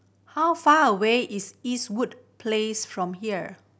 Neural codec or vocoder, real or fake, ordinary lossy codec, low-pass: none; real; none; none